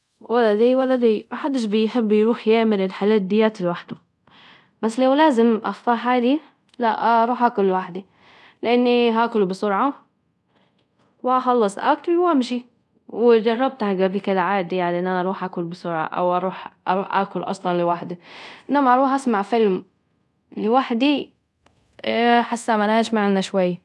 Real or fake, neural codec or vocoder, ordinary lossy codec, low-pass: fake; codec, 24 kHz, 0.5 kbps, DualCodec; none; none